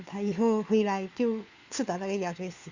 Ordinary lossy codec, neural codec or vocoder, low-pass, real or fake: Opus, 64 kbps; codec, 16 kHz, 4 kbps, FunCodec, trained on LibriTTS, 50 frames a second; 7.2 kHz; fake